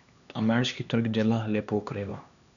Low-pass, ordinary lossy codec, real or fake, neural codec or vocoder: 7.2 kHz; none; fake; codec, 16 kHz, 2 kbps, X-Codec, HuBERT features, trained on LibriSpeech